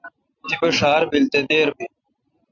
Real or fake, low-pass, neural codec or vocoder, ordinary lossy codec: real; 7.2 kHz; none; MP3, 64 kbps